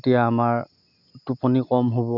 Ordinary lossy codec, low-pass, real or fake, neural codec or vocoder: none; 5.4 kHz; real; none